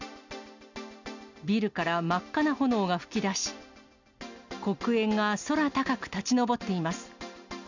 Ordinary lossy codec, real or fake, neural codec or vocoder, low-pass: none; real; none; 7.2 kHz